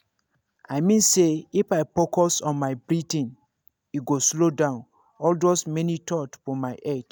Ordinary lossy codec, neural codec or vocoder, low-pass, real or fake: none; none; none; real